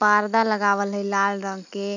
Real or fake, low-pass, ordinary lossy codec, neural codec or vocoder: fake; 7.2 kHz; none; vocoder, 44.1 kHz, 128 mel bands every 256 samples, BigVGAN v2